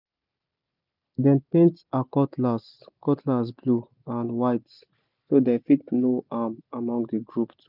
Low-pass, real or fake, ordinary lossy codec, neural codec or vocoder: 5.4 kHz; real; none; none